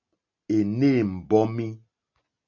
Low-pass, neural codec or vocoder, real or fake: 7.2 kHz; none; real